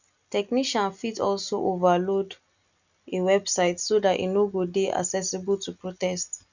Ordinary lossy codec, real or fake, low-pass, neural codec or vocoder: none; real; 7.2 kHz; none